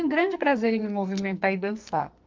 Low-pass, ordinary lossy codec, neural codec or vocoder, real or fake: 7.2 kHz; Opus, 32 kbps; codec, 44.1 kHz, 2.6 kbps, SNAC; fake